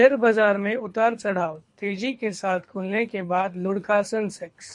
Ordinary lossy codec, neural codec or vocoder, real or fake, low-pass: MP3, 48 kbps; codec, 24 kHz, 3 kbps, HILCodec; fake; 10.8 kHz